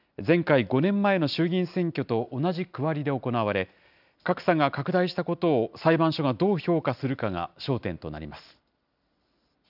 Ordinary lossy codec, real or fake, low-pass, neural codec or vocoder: none; real; 5.4 kHz; none